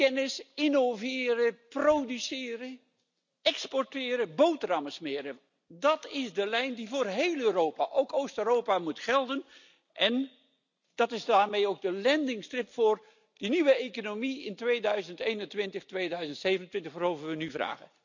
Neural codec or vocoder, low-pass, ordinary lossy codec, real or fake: none; 7.2 kHz; none; real